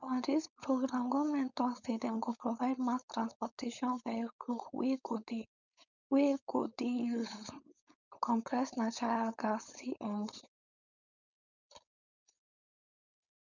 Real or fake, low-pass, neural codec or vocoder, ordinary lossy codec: fake; 7.2 kHz; codec, 16 kHz, 4.8 kbps, FACodec; none